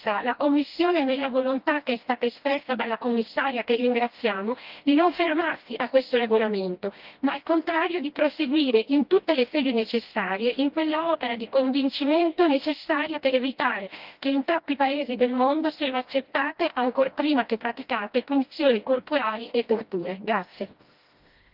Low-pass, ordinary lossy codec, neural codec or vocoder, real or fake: 5.4 kHz; Opus, 32 kbps; codec, 16 kHz, 1 kbps, FreqCodec, smaller model; fake